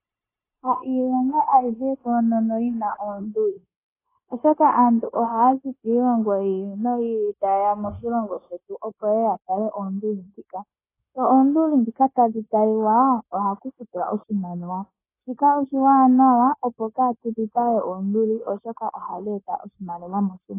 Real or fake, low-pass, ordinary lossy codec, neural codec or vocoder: fake; 3.6 kHz; AAC, 16 kbps; codec, 16 kHz, 0.9 kbps, LongCat-Audio-Codec